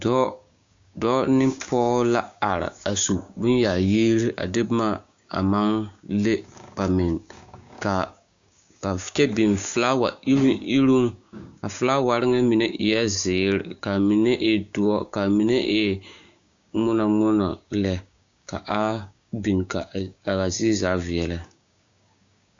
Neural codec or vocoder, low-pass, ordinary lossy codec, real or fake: codec, 16 kHz, 6 kbps, DAC; 7.2 kHz; AAC, 64 kbps; fake